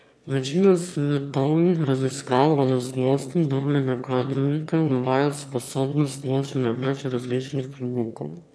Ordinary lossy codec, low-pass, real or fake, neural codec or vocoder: none; none; fake; autoencoder, 22.05 kHz, a latent of 192 numbers a frame, VITS, trained on one speaker